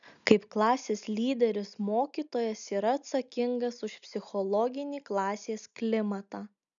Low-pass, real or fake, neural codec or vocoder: 7.2 kHz; real; none